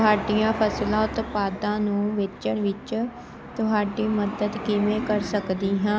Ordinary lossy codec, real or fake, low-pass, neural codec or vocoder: none; real; none; none